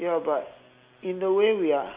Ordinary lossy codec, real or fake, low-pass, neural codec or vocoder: Opus, 64 kbps; real; 3.6 kHz; none